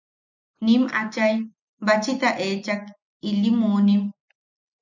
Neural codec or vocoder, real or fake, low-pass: none; real; 7.2 kHz